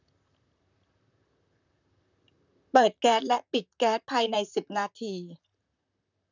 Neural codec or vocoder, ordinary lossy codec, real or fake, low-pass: vocoder, 44.1 kHz, 128 mel bands, Pupu-Vocoder; none; fake; 7.2 kHz